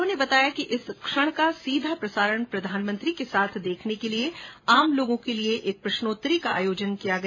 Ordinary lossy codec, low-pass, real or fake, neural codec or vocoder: MP3, 32 kbps; 7.2 kHz; real; none